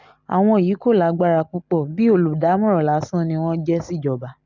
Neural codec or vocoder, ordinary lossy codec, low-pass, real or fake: none; none; 7.2 kHz; real